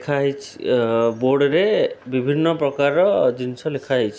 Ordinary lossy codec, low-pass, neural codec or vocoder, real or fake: none; none; none; real